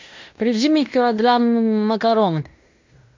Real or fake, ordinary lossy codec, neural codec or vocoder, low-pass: fake; MP3, 64 kbps; codec, 16 kHz in and 24 kHz out, 0.9 kbps, LongCat-Audio-Codec, fine tuned four codebook decoder; 7.2 kHz